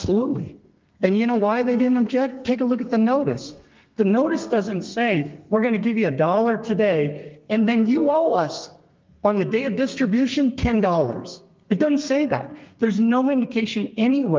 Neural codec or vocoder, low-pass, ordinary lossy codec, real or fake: codec, 32 kHz, 1.9 kbps, SNAC; 7.2 kHz; Opus, 32 kbps; fake